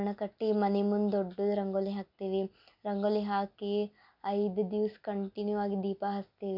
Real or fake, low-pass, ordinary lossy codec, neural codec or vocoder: real; 5.4 kHz; none; none